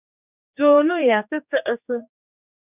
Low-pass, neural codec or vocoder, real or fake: 3.6 kHz; codec, 16 kHz, 2 kbps, X-Codec, HuBERT features, trained on general audio; fake